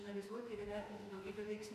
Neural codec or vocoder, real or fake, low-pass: autoencoder, 48 kHz, 32 numbers a frame, DAC-VAE, trained on Japanese speech; fake; 14.4 kHz